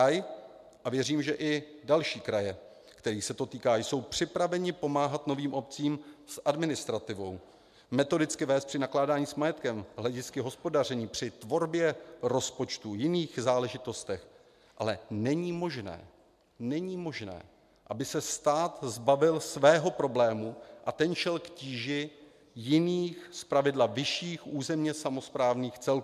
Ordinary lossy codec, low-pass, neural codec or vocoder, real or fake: MP3, 96 kbps; 14.4 kHz; none; real